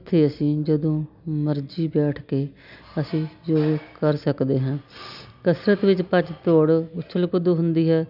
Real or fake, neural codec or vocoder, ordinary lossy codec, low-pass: real; none; none; 5.4 kHz